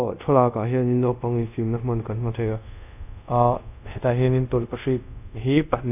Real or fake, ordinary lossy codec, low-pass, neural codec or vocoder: fake; none; 3.6 kHz; codec, 24 kHz, 0.5 kbps, DualCodec